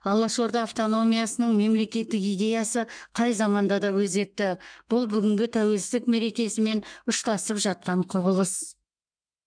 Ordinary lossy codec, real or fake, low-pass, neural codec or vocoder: none; fake; 9.9 kHz; codec, 32 kHz, 1.9 kbps, SNAC